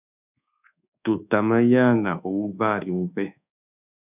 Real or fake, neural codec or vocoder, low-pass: fake; codec, 24 kHz, 1.2 kbps, DualCodec; 3.6 kHz